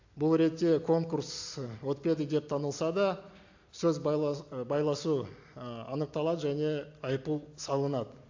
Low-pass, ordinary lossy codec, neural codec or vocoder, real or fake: 7.2 kHz; AAC, 48 kbps; none; real